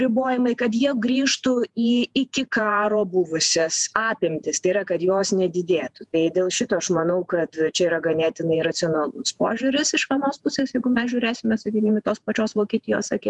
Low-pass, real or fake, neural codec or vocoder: 10.8 kHz; fake; vocoder, 48 kHz, 128 mel bands, Vocos